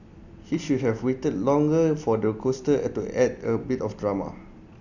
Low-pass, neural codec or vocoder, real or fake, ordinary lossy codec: 7.2 kHz; vocoder, 44.1 kHz, 128 mel bands every 256 samples, BigVGAN v2; fake; none